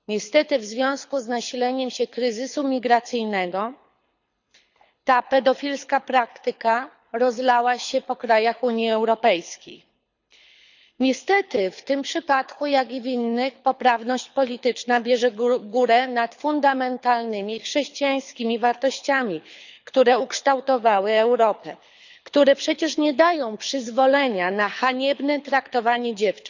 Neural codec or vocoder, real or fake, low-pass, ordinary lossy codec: codec, 24 kHz, 6 kbps, HILCodec; fake; 7.2 kHz; none